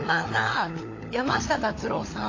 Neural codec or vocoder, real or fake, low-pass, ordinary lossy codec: codec, 16 kHz, 16 kbps, FunCodec, trained on LibriTTS, 50 frames a second; fake; 7.2 kHz; AAC, 32 kbps